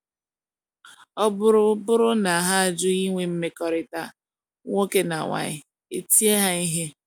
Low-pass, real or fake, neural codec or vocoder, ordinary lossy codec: none; real; none; none